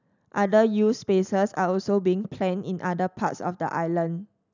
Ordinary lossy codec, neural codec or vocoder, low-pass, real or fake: none; none; 7.2 kHz; real